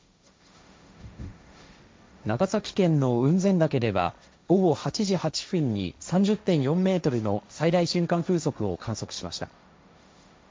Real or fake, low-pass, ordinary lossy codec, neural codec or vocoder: fake; none; none; codec, 16 kHz, 1.1 kbps, Voila-Tokenizer